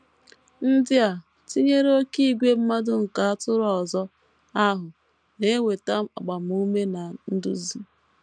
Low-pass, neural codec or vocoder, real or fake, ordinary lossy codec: 9.9 kHz; none; real; none